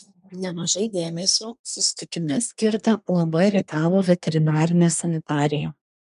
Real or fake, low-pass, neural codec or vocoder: fake; 10.8 kHz; codec, 24 kHz, 1 kbps, SNAC